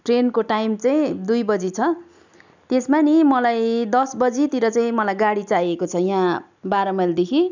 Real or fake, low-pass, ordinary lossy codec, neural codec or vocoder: real; 7.2 kHz; none; none